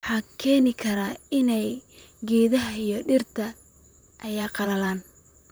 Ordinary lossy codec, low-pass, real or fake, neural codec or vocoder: none; none; fake; vocoder, 44.1 kHz, 128 mel bands, Pupu-Vocoder